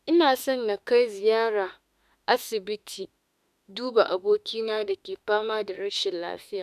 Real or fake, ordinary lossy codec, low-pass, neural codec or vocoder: fake; none; 14.4 kHz; autoencoder, 48 kHz, 32 numbers a frame, DAC-VAE, trained on Japanese speech